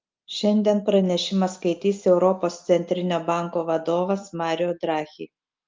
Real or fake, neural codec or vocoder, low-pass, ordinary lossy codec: real; none; 7.2 kHz; Opus, 24 kbps